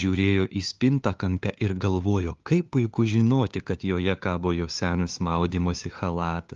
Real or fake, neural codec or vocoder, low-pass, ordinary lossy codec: fake; codec, 16 kHz, 2 kbps, FunCodec, trained on LibriTTS, 25 frames a second; 7.2 kHz; Opus, 32 kbps